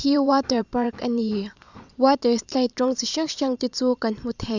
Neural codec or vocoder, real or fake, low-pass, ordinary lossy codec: vocoder, 44.1 kHz, 128 mel bands every 512 samples, BigVGAN v2; fake; 7.2 kHz; none